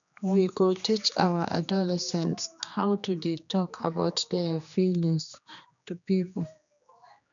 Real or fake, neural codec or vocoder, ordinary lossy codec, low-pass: fake; codec, 16 kHz, 2 kbps, X-Codec, HuBERT features, trained on general audio; none; 7.2 kHz